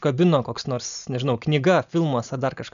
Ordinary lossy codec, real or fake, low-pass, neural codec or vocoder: MP3, 96 kbps; real; 7.2 kHz; none